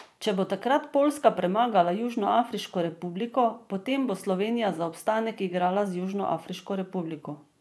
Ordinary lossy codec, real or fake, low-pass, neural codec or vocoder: none; fake; none; vocoder, 24 kHz, 100 mel bands, Vocos